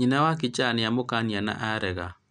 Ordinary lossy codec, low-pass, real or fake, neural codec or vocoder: none; 9.9 kHz; real; none